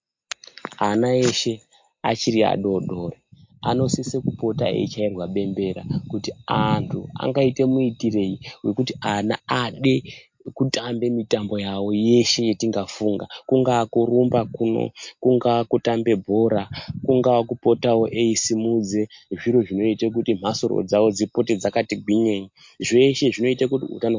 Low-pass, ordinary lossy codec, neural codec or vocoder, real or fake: 7.2 kHz; MP3, 48 kbps; none; real